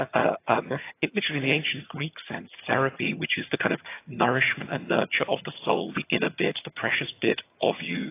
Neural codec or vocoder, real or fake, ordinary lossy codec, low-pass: vocoder, 22.05 kHz, 80 mel bands, HiFi-GAN; fake; AAC, 24 kbps; 3.6 kHz